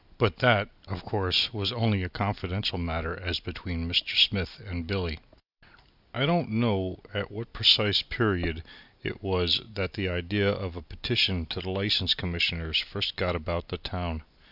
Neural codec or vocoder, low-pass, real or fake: none; 5.4 kHz; real